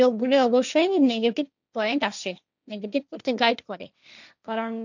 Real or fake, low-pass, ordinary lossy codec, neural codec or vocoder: fake; 7.2 kHz; none; codec, 16 kHz, 1.1 kbps, Voila-Tokenizer